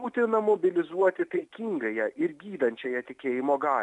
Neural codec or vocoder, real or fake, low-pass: none; real; 10.8 kHz